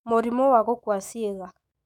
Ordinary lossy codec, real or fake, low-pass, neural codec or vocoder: none; fake; 19.8 kHz; autoencoder, 48 kHz, 128 numbers a frame, DAC-VAE, trained on Japanese speech